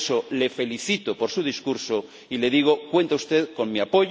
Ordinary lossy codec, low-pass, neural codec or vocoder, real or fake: none; none; none; real